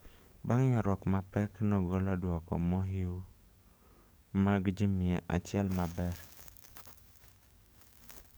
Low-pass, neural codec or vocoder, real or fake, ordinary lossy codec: none; codec, 44.1 kHz, 7.8 kbps, DAC; fake; none